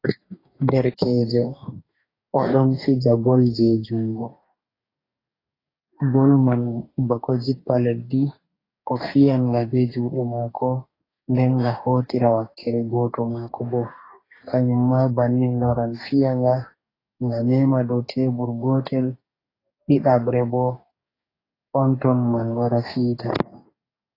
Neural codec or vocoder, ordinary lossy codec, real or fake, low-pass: codec, 44.1 kHz, 2.6 kbps, DAC; AAC, 24 kbps; fake; 5.4 kHz